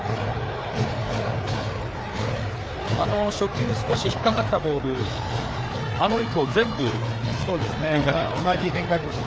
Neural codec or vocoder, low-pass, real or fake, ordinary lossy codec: codec, 16 kHz, 4 kbps, FreqCodec, larger model; none; fake; none